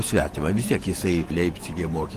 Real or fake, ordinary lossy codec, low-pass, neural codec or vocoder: fake; Opus, 24 kbps; 14.4 kHz; vocoder, 48 kHz, 128 mel bands, Vocos